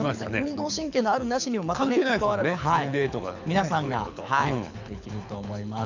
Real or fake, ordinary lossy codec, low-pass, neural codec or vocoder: fake; none; 7.2 kHz; codec, 24 kHz, 6 kbps, HILCodec